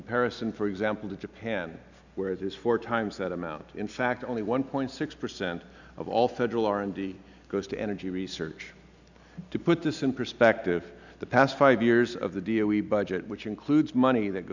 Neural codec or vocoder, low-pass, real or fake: none; 7.2 kHz; real